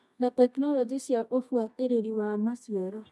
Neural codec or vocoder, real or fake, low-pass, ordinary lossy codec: codec, 24 kHz, 0.9 kbps, WavTokenizer, medium music audio release; fake; none; none